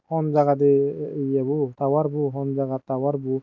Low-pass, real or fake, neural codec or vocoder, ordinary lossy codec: 7.2 kHz; real; none; none